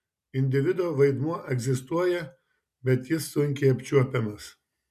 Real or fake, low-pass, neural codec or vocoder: real; 14.4 kHz; none